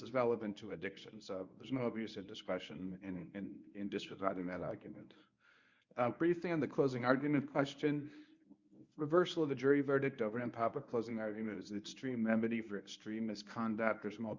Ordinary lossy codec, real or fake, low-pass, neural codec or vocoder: Opus, 64 kbps; fake; 7.2 kHz; codec, 24 kHz, 0.9 kbps, WavTokenizer, medium speech release version 1